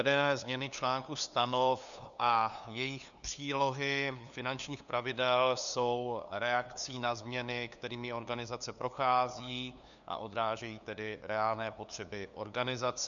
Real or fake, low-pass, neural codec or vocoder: fake; 7.2 kHz; codec, 16 kHz, 2 kbps, FunCodec, trained on LibriTTS, 25 frames a second